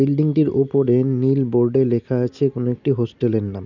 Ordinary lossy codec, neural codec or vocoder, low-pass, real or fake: none; none; 7.2 kHz; real